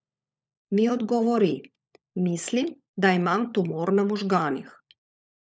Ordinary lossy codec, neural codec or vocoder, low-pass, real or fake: none; codec, 16 kHz, 16 kbps, FunCodec, trained on LibriTTS, 50 frames a second; none; fake